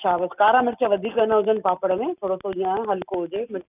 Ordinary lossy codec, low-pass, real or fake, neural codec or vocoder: none; 3.6 kHz; real; none